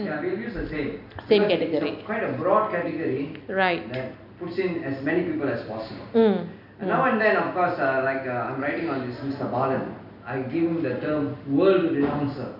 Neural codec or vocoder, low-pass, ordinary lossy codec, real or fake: none; 5.4 kHz; none; real